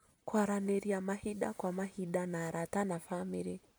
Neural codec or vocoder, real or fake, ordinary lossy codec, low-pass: none; real; none; none